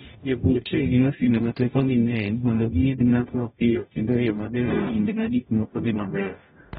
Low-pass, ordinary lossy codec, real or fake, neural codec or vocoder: 19.8 kHz; AAC, 16 kbps; fake; codec, 44.1 kHz, 0.9 kbps, DAC